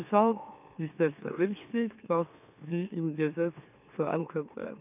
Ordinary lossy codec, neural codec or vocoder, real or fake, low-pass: none; autoencoder, 44.1 kHz, a latent of 192 numbers a frame, MeloTTS; fake; 3.6 kHz